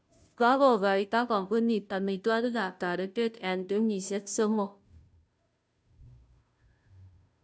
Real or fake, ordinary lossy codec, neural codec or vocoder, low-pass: fake; none; codec, 16 kHz, 0.5 kbps, FunCodec, trained on Chinese and English, 25 frames a second; none